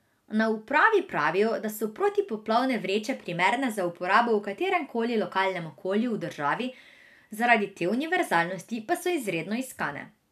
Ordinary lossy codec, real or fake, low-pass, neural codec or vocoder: none; real; 14.4 kHz; none